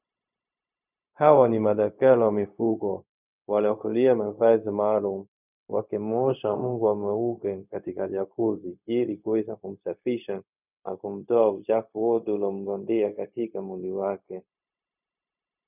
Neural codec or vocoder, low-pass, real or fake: codec, 16 kHz, 0.4 kbps, LongCat-Audio-Codec; 3.6 kHz; fake